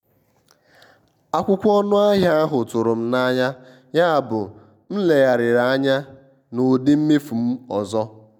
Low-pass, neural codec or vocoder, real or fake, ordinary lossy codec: 19.8 kHz; none; real; none